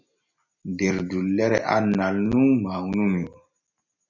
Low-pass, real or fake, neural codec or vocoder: 7.2 kHz; real; none